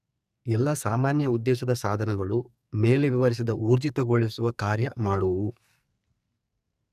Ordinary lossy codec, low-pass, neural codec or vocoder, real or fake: none; 14.4 kHz; codec, 44.1 kHz, 2.6 kbps, SNAC; fake